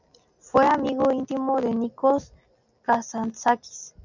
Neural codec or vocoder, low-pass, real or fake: none; 7.2 kHz; real